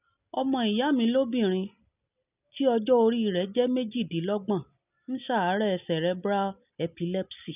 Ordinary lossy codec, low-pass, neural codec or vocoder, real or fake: none; 3.6 kHz; none; real